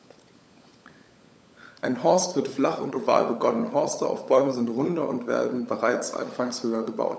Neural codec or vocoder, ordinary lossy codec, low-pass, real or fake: codec, 16 kHz, 16 kbps, FunCodec, trained on LibriTTS, 50 frames a second; none; none; fake